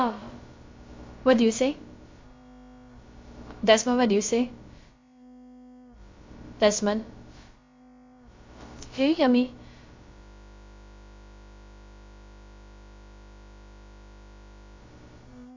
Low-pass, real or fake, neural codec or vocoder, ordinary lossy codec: 7.2 kHz; fake; codec, 16 kHz, about 1 kbps, DyCAST, with the encoder's durations; MP3, 48 kbps